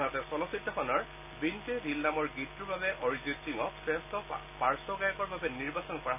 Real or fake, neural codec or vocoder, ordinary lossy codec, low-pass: real; none; none; 3.6 kHz